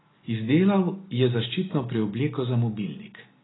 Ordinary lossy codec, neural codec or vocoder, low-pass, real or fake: AAC, 16 kbps; none; 7.2 kHz; real